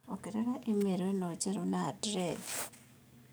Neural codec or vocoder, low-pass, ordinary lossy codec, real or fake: codec, 44.1 kHz, 7.8 kbps, DAC; none; none; fake